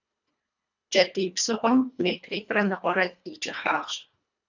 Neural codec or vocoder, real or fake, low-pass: codec, 24 kHz, 1.5 kbps, HILCodec; fake; 7.2 kHz